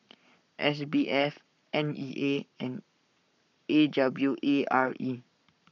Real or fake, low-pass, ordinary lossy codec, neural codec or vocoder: fake; 7.2 kHz; none; codec, 44.1 kHz, 7.8 kbps, Pupu-Codec